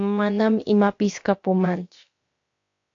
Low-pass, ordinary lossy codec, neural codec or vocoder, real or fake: 7.2 kHz; AAC, 48 kbps; codec, 16 kHz, about 1 kbps, DyCAST, with the encoder's durations; fake